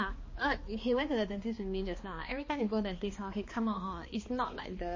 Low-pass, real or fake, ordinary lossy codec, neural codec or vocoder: 7.2 kHz; fake; MP3, 32 kbps; codec, 16 kHz, 2 kbps, X-Codec, HuBERT features, trained on balanced general audio